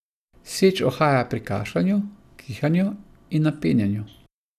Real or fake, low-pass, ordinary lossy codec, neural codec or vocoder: real; 14.4 kHz; none; none